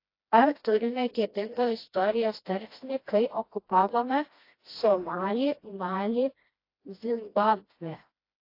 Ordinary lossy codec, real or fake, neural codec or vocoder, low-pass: AAC, 32 kbps; fake; codec, 16 kHz, 1 kbps, FreqCodec, smaller model; 5.4 kHz